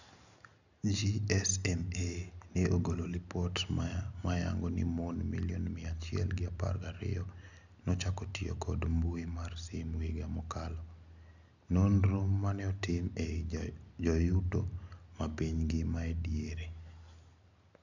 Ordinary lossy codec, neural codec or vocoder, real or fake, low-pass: none; none; real; 7.2 kHz